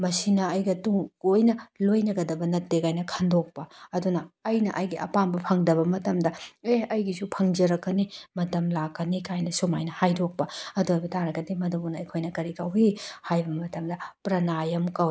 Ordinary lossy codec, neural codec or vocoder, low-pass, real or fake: none; none; none; real